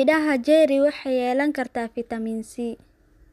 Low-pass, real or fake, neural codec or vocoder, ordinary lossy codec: 14.4 kHz; real; none; none